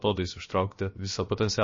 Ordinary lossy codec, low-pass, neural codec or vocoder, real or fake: MP3, 32 kbps; 7.2 kHz; codec, 16 kHz, about 1 kbps, DyCAST, with the encoder's durations; fake